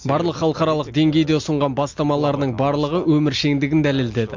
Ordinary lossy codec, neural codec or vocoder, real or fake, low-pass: MP3, 48 kbps; none; real; 7.2 kHz